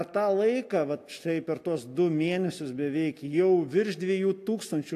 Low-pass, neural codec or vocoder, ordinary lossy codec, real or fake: 14.4 kHz; none; AAC, 64 kbps; real